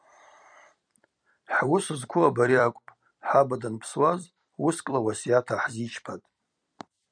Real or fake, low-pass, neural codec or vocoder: fake; 9.9 kHz; vocoder, 44.1 kHz, 128 mel bands every 256 samples, BigVGAN v2